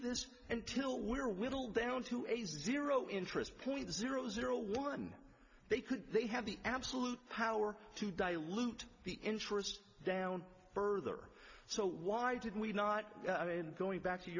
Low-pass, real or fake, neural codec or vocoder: 7.2 kHz; real; none